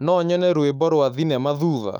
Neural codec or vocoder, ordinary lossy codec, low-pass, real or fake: autoencoder, 48 kHz, 128 numbers a frame, DAC-VAE, trained on Japanese speech; none; 19.8 kHz; fake